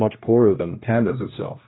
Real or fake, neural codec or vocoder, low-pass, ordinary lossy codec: fake; codec, 16 kHz, 1 kbps, X-Codec, HuBERT features, trained on general audio; 7.2 kHz; AAC, 16 kbps